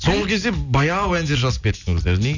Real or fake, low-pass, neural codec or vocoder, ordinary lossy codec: real; 7.2 kHz; none; none